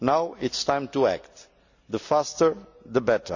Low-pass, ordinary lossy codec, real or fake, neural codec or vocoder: 7.2 kHz; none; real; none